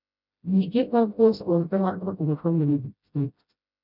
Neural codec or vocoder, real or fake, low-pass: codec, 16 kHz, 0.5 kbps, FreqCodec, smaller model; fake; 5.4 kHz